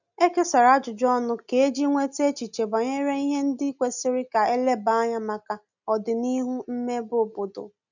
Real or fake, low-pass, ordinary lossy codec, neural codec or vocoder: real; 7.2 kHz; none; none